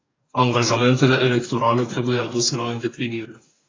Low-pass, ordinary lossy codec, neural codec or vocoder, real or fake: 7.2 kHz; AAC, 32 kbps; codec, 44.1 kHz, 2.6 kbps, DAC; fake